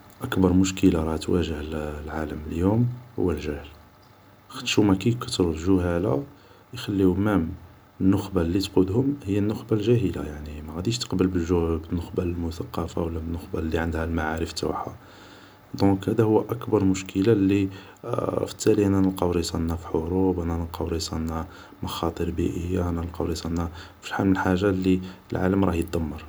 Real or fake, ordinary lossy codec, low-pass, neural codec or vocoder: real; none; none; none